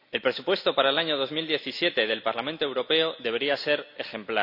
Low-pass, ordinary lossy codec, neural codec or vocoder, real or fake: 5.4 kHz; none; none; real